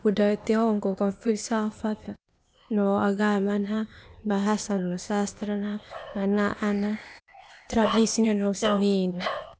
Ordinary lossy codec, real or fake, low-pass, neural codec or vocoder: none; fake; none; codec, 16 kHz, 0.8 kbps, ZipCodec